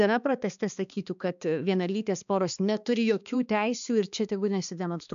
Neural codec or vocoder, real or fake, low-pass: codec, 16 kHz, 2 kbps, X-Codec, HuBERT features, trained on balanced general audio; fake; 7.2 kHz